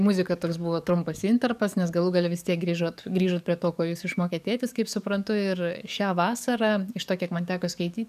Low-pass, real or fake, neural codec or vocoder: 14.4 kHz; fake; codec, 44.1 kHz, 7.8 kbps, DAC